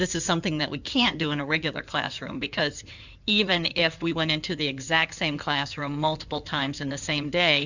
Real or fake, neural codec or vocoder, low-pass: fake; codec, 16 kHz in and 24 kHz out, 2.2 kbps, FireRedTTS-2 codec; 7.2 kHz